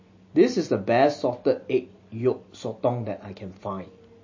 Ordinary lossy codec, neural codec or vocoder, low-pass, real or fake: MP3, 32 kbps; none; 7.2 kHz; real